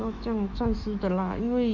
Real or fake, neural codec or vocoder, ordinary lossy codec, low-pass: fake; autoencoder, 48 kHz, 128 numbers a frame, DAC-VAE, trained on Japanese speech; Opus, 64 kbps; 7.2 kHz